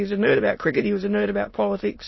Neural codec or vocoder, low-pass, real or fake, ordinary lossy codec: autoencoder, 22.05 kHz, a latent of 192 numbers a frame, VITS, trained on many speakers; 7.2 kHz; fake; MP3, 24 kbps